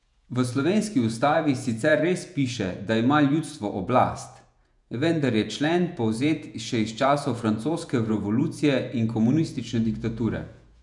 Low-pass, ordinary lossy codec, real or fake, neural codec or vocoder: 10.8 kHz; none; fake; vocoder, 48 kHz, 128 mel bands, Vocos